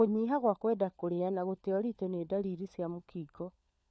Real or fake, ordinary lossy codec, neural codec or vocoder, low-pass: fake; none; codec, 16 kHz, 4 kbps, FunCodec, trained on LibriTTS, 50 frames a second; none